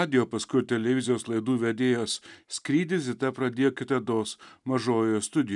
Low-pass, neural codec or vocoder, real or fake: 10.8 kHz; none; real